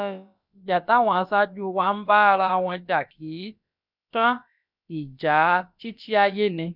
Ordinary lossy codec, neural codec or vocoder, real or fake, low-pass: none; codec, 16 kHz, about 1 kbps, DyCAST, with the encoder's durations; fake; 5.4 kHz